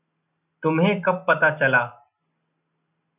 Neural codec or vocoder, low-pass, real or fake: none; 3.6 kHz; real